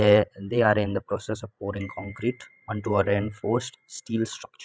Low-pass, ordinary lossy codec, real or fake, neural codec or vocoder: none; none; fake; codec, 16 kHz, 16 kbps, FreqCodec, larger model